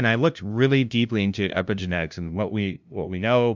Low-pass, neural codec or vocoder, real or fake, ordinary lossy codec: 7.2 kHz; codec, 16 kHz, 0.5 kbps, FunCodec, trained on LibriTTS, 25 frames a second; fake; MP3, 64 kbps